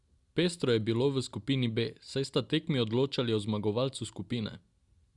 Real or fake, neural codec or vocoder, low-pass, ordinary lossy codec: real; none; 10.8 kHz; Opus, 64 kbps